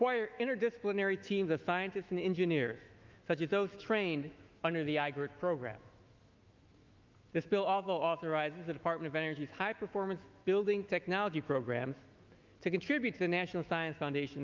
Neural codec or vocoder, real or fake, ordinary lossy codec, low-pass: autoencoder, 48 kHz, 128 numbers a frame, DAC-VAE, trained on Japanese speech; fake; Opus, 24 kbps; 7.2 kHz